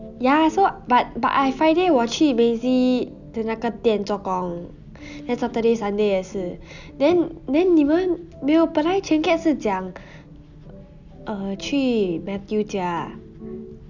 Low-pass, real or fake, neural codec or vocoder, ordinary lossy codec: 7.2 kHz; real; none; none